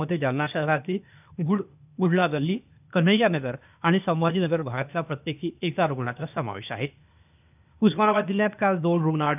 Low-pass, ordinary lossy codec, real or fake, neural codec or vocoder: 3.6 kHz; none; fake; codec, 16 kHz, 0.8 kbps, ZipCodec